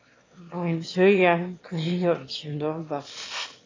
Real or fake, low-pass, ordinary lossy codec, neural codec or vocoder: fake; 7.2 kHz; AAC, 32 kbps; autoencoder, 22.05 kHz, a latent of 192 numbers a frame, VITS, trained on one speaker